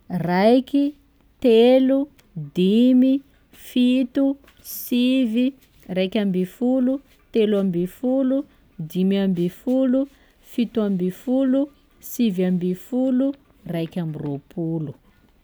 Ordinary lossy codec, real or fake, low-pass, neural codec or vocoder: none; real; none; none